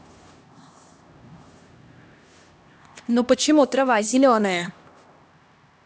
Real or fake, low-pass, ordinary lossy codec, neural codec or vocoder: fake; none; none; codec, 16 kHz, 1 kbps, X-Codec, HuBERT features, trained on LibriSpeech